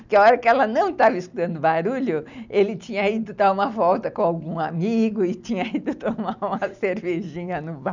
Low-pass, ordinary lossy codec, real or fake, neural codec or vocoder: 7.2 kHz; none; real; none